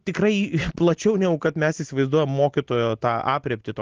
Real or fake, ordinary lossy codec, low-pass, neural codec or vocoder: real; Opus, 16 kbps; 7.2 kHz; none